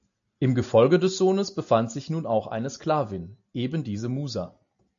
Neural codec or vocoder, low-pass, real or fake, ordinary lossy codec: none; 7.2 kHz; real; AAC, 64 kbps